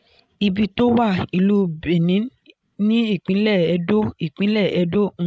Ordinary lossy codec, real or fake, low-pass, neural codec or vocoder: none; fake; none; codec, 16 kHz, 16 kbps, FreqCodec, larger model